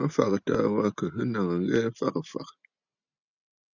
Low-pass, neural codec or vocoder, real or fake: 7.2 kHz; none; real